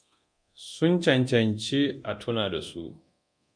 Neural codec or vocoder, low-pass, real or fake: codec, 24 kHz, 0.9 kbps, DualCodec; 9.9 kHz; fake